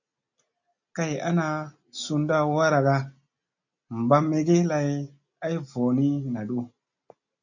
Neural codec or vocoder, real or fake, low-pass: none; real; 7.2 kHz